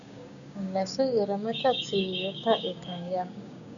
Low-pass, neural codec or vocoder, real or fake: 7.2 kHz; codec, 16 kHz, 6 kbps, DAC; fake